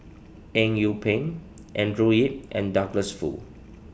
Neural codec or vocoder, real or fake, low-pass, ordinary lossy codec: none; real; none; none